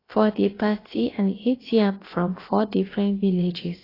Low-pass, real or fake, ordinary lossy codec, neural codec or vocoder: 5.4 kHz; fake; AAC, 24 kbps; codec, 16 kHz, about 1 kbps, DyCAST, with the encoder's durations